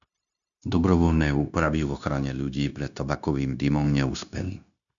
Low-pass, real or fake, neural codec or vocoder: 7.2 kHz; fake; codec, 16 kHz, 0.9 kbps, LongCat-Audio-Codec